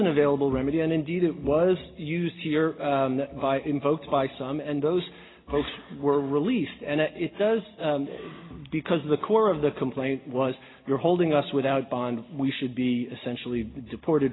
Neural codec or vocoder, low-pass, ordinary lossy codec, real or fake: none; 7.2 kHz; AAC, 16 kbps; real